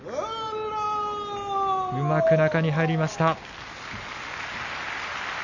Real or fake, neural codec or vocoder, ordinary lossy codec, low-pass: real; none; none; 7.2 kHz